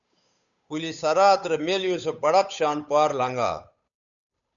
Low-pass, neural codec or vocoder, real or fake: 7.2 kHz; codec, 16 kHz, 8 kbps, FunCodec, trained on Chinese and English, 25 frames a second; fake